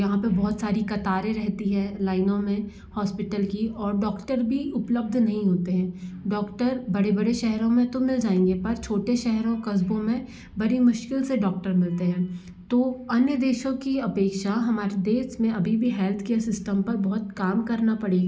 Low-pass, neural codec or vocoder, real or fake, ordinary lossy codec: none; none; real; none